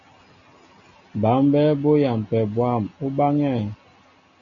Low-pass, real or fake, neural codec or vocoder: 7.2 kHz; real; none